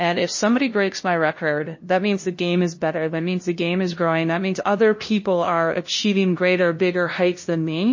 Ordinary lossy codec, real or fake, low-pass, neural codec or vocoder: MP3, 32 kbps; fake; 7.2 kHz; codec, 16 kHz, 0.5 kbps, FunCodec, trained on LibriTTS, 25 frames a second